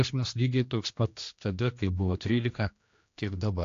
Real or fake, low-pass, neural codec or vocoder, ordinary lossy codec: fake; 7.2 kHz; codec, 16 kHz, 1 kbps, X-Codec, HuBERT features, trained on general audio; AAC, 48 kbps